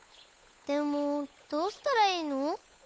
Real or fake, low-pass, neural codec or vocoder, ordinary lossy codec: fake; none; codec, 16 kHz, 8 kbps, FunCodec, trained on Chinese and English, 25 frames a second; none